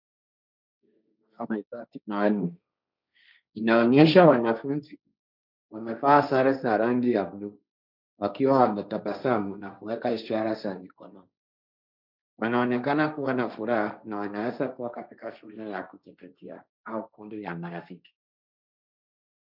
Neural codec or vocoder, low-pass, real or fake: codec, 16 kHz, 1.1 kbps, Voila-Tokenizer; 5.4 kHz; fake